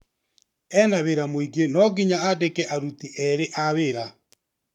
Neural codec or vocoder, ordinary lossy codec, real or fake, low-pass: codec, 44.1 kHz, 7.8 kbps, Pupu-Codec; none; fake; 19.8 kHz